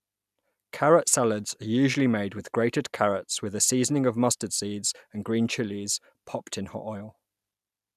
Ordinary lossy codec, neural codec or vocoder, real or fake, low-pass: none; none; real; 14.4 kHz